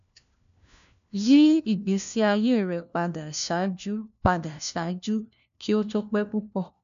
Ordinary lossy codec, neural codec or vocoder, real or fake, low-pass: AAC, 96 kbps; codec, 16 kHz, 1 kbps, FunCodec, trained on LibriTTS, 50 frames a second; fake; 7.2 kHz